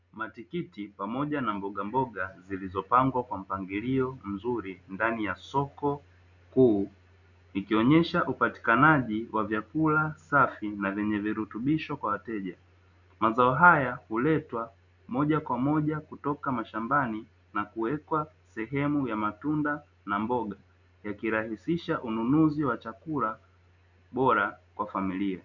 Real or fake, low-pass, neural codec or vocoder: real; 7.2 kHz; none